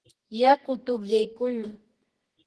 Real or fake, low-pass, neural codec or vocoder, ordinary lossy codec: fake; 10.8 kHz; codec, 24 kHz, 0.9 kbps, WavTokenizer, medium music audio release; Opus, 16 kbps